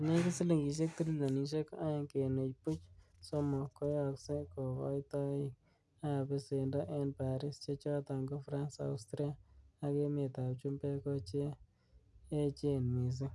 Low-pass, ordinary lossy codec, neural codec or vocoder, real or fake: none; none; none; real